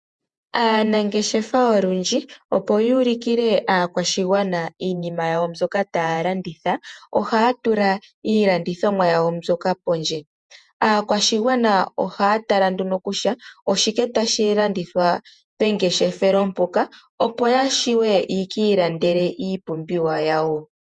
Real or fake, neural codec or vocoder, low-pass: fake; vocoder, 48 kHz, 128 mel bands, Vocos; 10.8 kHz